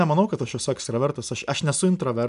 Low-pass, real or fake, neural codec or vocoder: 10.8 kHz; real; none